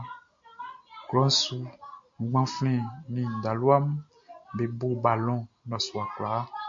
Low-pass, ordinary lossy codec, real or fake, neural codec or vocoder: 7.2 kHz; MP3, 48 kbps; real; none